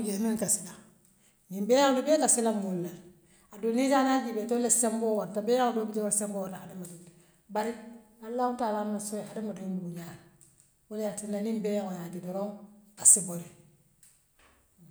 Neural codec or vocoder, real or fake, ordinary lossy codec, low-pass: none; real; none; none